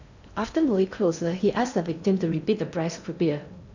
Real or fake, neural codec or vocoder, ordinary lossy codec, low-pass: fake; codec, 16 kHz in and 24 kHz out, 0.6 kbps, FocalCodec, streaming, 2048 codes; none; 7.2 kHz